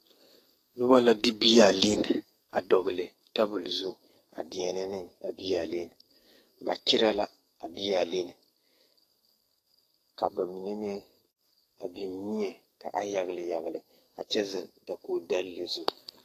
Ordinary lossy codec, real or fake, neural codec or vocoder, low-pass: AAC, 48 kbps; fake; codec, 44.1 kHz, 2.6 kbps, SNAC; 14.4 kHz